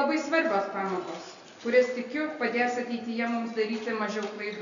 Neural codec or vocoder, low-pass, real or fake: none; 7.2 kHz; real